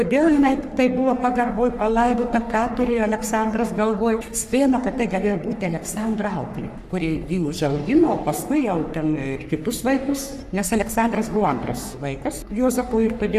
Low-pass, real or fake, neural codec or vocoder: 14.4 kHz; fake; codec, 44.1 kHz, 3.4 kbps, Pupu-Codec